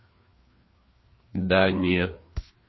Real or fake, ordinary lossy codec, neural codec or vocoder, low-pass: fake; MP3, 24 kbps; codec, 16 kHz, 2 kbps, FreqCodec, larger model; 7.2 kHz